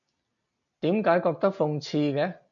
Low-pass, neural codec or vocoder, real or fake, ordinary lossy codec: 7.2 kHz; none; real; MP3, 96 kbps